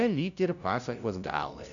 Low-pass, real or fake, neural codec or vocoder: 7.2 kHz; fake; codec, 16 kHz, 0.5 kbps, FunCodec, trained on LibriTTS, 25 frames a second